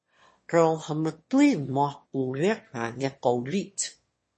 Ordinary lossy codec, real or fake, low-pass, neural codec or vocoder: MP3, 32 kbps; fake; 9.9 kHz; autoencoder, 22.05 kHz, a latent of 192 numbers a frame, VITS, trained on one speaker